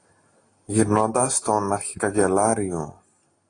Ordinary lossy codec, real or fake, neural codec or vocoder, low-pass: AAC, 32 kbps; real; none; 9.9 kHz